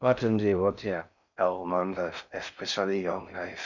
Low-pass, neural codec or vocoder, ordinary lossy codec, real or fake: 7.2 kHz; codec, 16 kHz in and 24 kHz out, 0.6 kbps, FocalCodec, streaming, 4096 codes; none; fake